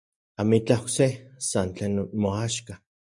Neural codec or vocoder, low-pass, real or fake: none; 10.8 kHz; real